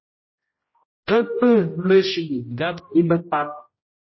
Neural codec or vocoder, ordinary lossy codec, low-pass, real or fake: codec, 16 kHz, 0.5 kbps, X-Codec, HuBERT features, trained on general audio; MP3, 24 kbps; 7.2 kHz; fake